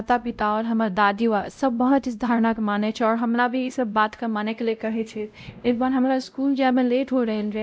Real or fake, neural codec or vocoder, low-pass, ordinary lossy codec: fake; codec, 16 kHz, 0.5 kbps, X-Codec, WavLM features, trained on Multilingual LibriSpeech; none; none